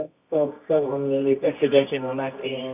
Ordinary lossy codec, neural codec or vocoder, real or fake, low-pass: none; codec, 24 kHz, 0.9 kbps, WavTokenizer, medium music audio release; fake; 3.6 kHz